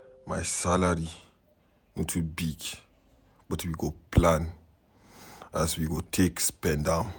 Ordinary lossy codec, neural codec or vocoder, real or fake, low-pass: none; vocoder, 48 kHz, 128 mel bands, Vocos; fake; none